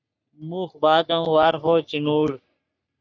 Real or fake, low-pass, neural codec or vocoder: fake; 7.2 kHz; codec, 44.1 kHz, 3.4 kbps, Pupu-Codec